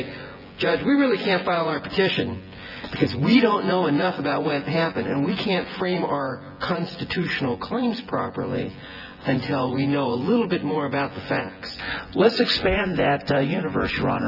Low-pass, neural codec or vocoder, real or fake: 5.4 kHz; vocoder, 24 kHz, 100 mel bands, Vocos; fake